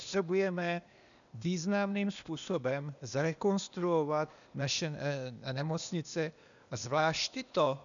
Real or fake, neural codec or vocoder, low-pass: fake; codec, 16 kHz, 0.8 kbps, ZipCodec; 7.2 kHz